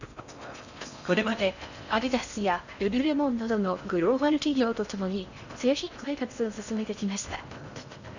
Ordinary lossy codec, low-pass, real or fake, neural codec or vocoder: none; 7.2 kHz; fake; codec, 16 kHz in and 24 kHz out, 0.6 kbps, FocalCodec, streaming, 4096 codes